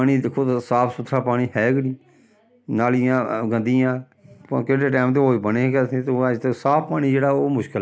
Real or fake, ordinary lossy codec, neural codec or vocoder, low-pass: real; none; none; none